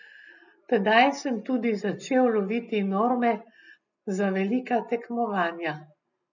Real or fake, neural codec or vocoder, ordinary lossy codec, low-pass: real; none; none; 7.2 kHz